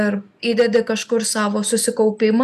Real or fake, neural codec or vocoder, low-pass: real; none; 14.4 kHz